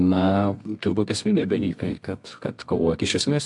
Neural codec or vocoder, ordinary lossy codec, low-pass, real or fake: codec, 24 kHz, 0.9 kbps, WavTokenizer, medium music audio release; MP3, 64 kbps; 10.8 kHz; fake